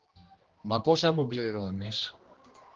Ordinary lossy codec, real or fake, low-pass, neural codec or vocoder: Opus, 16 kbps; fake; 7.2 kHz; codec, 16 kHz, 1 kbps, X-Codec, HuBERT features, trained on general audio